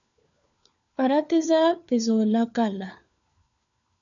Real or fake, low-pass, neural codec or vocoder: fake; 7.2 kHz; codec, 16 kHz, 4 kbps, FunCodec, trained on LibriTTS, 50 frames a second